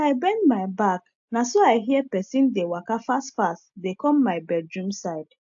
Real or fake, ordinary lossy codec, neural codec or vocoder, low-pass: real; AAC, 64 kbps; none; 7.2 kHz